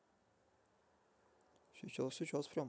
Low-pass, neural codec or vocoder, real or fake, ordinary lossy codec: none; none; real; none